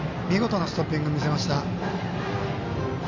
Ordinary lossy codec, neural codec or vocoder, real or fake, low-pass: none; none; real; 7.2 kHz